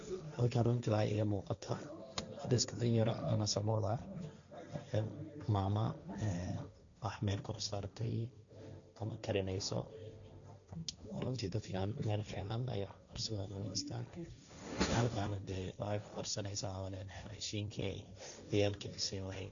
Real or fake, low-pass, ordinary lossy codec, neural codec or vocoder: fake; 7.2 kHz; none; codec, 16 kHz, 1.1 kbps, Voila-Tokenizer